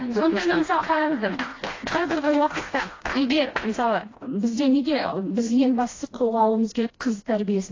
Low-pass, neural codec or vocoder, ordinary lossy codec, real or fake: 7.2 kHz; codec, 16 kHz, 1 kbps, FreqCodec, smaller model; AAC, 32 kbps; fake